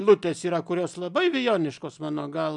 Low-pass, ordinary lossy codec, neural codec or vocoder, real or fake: 10.8 kHz; MP3, 96 kbps; none; real